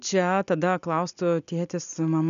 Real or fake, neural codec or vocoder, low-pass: real; none; 7.2 kHz